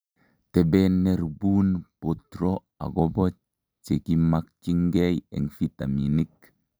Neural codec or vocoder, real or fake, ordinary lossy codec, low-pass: none; real; none; none